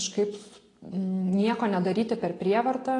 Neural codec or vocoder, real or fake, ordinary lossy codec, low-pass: none; real; AAC, 32 kbps; 10.8 kHz